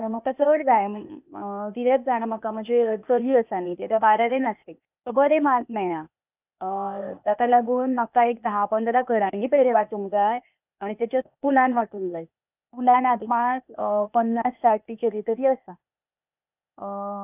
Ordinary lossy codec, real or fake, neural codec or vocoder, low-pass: none; fake; codec, 16 kHz, 0.8 kbps, ZipCodec; 3.6 kHz